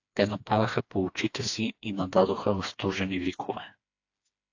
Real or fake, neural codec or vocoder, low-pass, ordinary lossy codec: fake; codec, 16 kHz, 2 kbps, FreqCodec, smaller model; 7.2 kHz; AAC, 48 kbps